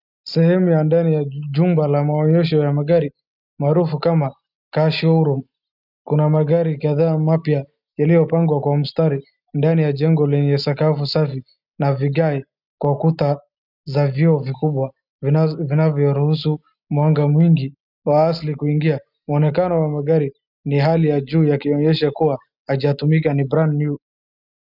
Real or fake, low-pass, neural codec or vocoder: real; 5.4 kHz; none